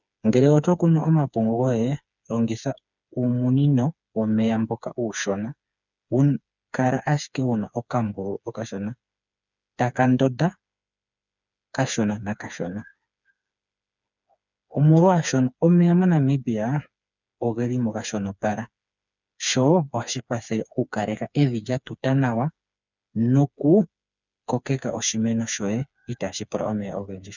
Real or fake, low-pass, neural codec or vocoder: fake; 7.2 kHz; codec, 16 kHz, 4 kbps, FreqCodec, smaller model